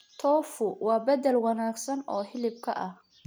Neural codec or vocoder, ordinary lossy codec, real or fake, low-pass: none; none; real; none